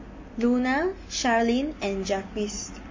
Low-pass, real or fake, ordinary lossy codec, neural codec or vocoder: 7.2 kHz; real; MP3, 32 kbps; none